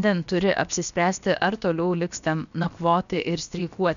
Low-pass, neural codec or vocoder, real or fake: 7.2 kHz; codec, 16 kHz, about 1 kbps, DyCAST, with the encoder's durations; fake